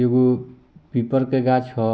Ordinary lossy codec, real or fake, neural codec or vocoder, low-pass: none; real; none; none